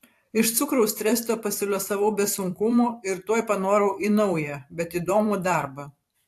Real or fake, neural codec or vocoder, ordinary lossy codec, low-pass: fake; vocoder, 44.1 kHz, 128 mel bands every 512 samples, BigVGAN v2; AAC, 64 kbps; 14.4 kHz